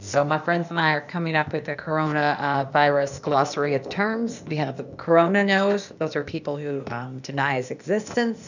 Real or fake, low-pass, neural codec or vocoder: fake; 7.2 kHz; codec, 16 kHz, 0.8 kbps, ZipCodec